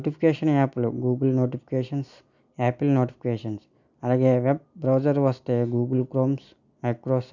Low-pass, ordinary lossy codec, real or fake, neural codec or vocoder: 7.2 kHz; none; real; none